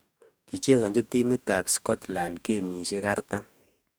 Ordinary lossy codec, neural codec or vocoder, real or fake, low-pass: none; codec, 44.1 kHz, 2.6 kbps, DAC; fake; none